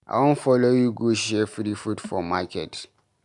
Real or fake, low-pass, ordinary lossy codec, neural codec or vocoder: real; 10.8 kHz; MP3, 96 kbps; none